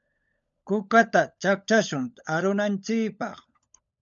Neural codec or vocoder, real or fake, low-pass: codec, 16 kHz, 16 kbps, FunCodec, trained on LibriTTS, 50 frames a second; fake; 7.2 kHz